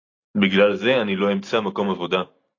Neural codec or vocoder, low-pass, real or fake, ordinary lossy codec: none; 7.2 kHz; real; AAC, 32 kbps